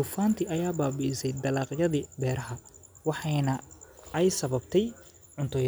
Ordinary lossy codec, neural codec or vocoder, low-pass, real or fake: none; none; none; real